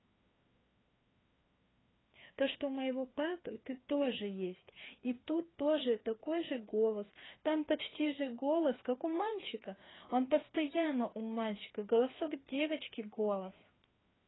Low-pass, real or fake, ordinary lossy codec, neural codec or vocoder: 7.2 kHz; fake; AAC, 16 kbps; codec, 16 kHz, 2 kbps, FreqCodec, larger model